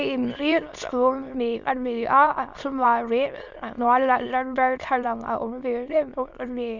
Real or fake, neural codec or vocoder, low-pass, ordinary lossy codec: fake; autoencoder, 22.05 kHz, a latent of 192 numbers a frame, VITS, trained on many speakers; 7.2 kHz; none